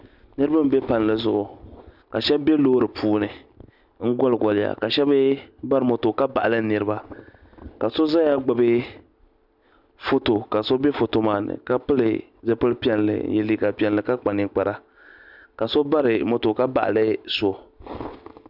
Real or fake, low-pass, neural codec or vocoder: real; 5.4 kHz; none